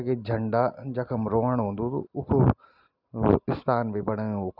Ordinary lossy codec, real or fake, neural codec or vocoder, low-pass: none; real; none; 5.4 kHz